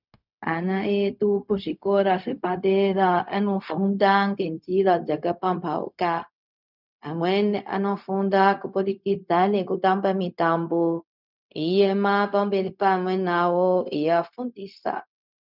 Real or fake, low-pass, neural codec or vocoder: fake; 5.4 kHz; codec, 16 kHz, 0.4 kbps, LongCat-Audio-Codec